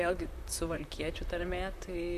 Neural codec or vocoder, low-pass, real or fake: vocoder, 44.1 kHz, 128 mel bands, Pupu-Vocoder; 14.4 kHz; fake